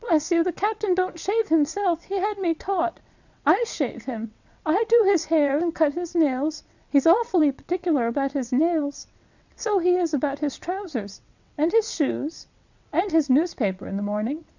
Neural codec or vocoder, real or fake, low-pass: vocoder, 22.05 kHz, 80 mel bands, WaveNeXt; fake; 7.2 kHz